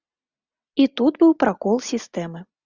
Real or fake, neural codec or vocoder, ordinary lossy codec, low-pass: real; none; Opus, 64 kbps; 7.2 kHz